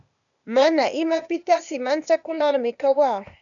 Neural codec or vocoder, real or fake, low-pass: codec, 16 kHz, 0.8 kbps, ZipCodec; fake; 7.2 kHz